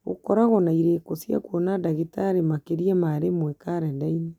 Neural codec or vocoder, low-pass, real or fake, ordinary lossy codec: none; 19.8 kHz; real; none